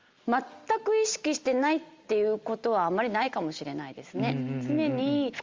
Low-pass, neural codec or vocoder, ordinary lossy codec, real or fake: 7.2 kHz; none; Opus, 32 kbps; real